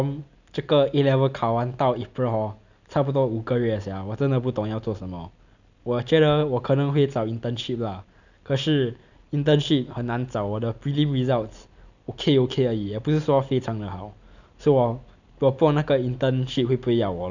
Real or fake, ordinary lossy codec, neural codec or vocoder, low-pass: real; none; none; 7.2 kHz